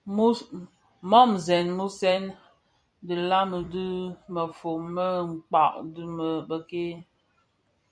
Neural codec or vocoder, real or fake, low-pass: vocoder, 44.1 kHz, 128 mel bands every 256 samples, BigVGAN v2; fake; 9.9 kHz